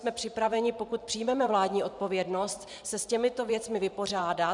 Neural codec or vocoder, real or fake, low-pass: vocoder, 44.1 kHz, 128 mel bands every 512 samples, BigVGAN v2; fake; 10.8 kHz